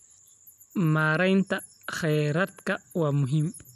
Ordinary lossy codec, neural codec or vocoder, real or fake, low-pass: none; none; real; 14.4 kHz